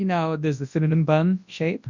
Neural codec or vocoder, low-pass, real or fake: codec, 24 kHz, 0.9 kbps, WavTokenizer, large speech release; 7.2 kHz; fake